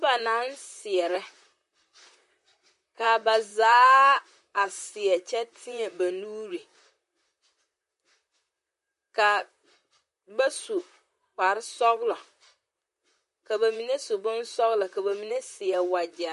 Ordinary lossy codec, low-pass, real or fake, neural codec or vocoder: MP3, 48 kbps; 14.4 kHz; fake; vocoder, 44.1 kHz, 128 mel bands, Pupu-Vocoder